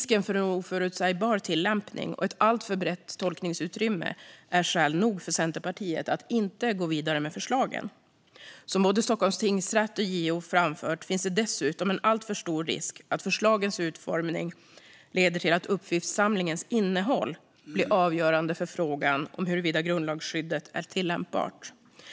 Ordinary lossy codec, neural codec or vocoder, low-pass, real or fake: none; none; none; real